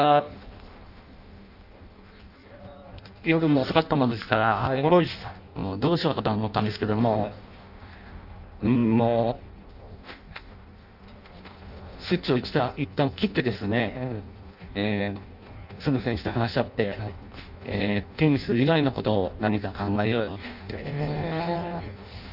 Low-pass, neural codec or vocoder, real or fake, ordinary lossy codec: 5.4 kHz; codec, 16 kHz in and 24 kHz out, 0.6 kbps, FireRedTTS-2 codec; fake; none